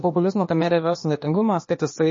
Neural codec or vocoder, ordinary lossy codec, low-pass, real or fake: codec, 16 kHz, 0.8 kbps, ZipCodec; MP3, 32 kbps; 7.2 kHz; fake